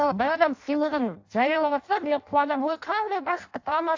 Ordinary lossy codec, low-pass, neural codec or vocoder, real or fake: AAC, 48 kbps; 7.2 kHz; codec, 16 kHz in and 24 kHz out, 0.6 kbps, FireRedTTS-2 codec; fake